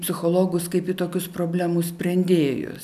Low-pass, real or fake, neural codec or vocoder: 14.4 kHz; real; none